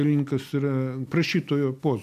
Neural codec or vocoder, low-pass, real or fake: none; 14.4 kHz; real